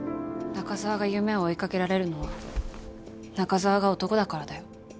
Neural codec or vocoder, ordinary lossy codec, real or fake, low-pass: none; none; real; none